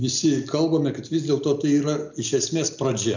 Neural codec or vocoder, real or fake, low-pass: none; real; 7.2 kHz